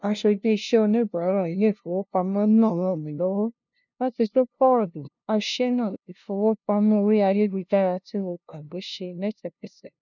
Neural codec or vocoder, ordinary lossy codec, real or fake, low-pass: codec, 16 kHz, 0.5 kbps, FunCodec, trained on LibriTTS, 25 frames a second; none; fake; 7.2 kHz